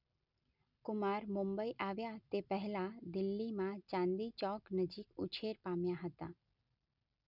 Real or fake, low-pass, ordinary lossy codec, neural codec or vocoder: real; 5.4 kHz; none; none